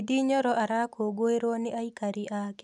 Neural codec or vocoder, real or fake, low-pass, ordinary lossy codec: none; real; 10.8 kHz; none